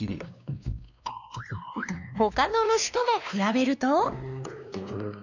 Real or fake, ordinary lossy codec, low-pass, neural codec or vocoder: fake; none; 7.2 kHz; codec, 16 kHz, 2 kbps, X-Codec, WavLM features, trained on Multilingual LibriSpeech